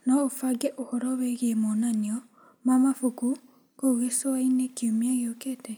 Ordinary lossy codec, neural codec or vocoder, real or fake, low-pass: none; none; real; none